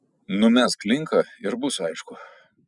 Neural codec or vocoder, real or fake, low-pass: none; real; 10.8 kHz